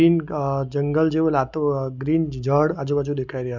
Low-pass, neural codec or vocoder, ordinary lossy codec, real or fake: 7.2 kHz; none; none; real